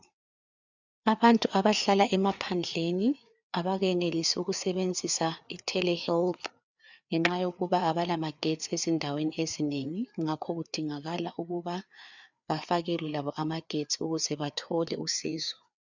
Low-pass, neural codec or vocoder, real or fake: 7.2 kHz; codec, 16 kHz, 4 kbps, FreqCodec, larger model; fake